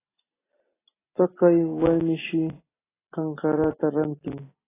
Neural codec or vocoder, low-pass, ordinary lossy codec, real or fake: none; 3.6 kHz; AAC, 16 kbps; real